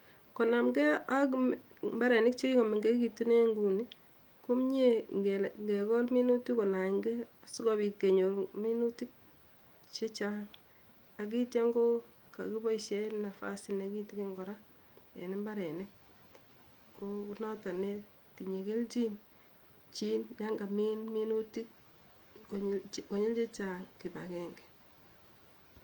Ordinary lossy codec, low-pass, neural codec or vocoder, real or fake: Opus, 32 kbps; 19.8 kHz; autoencoder, 48 kHz, 128 numbers a frame, DAC-VAE, trained on Japanese speech; fake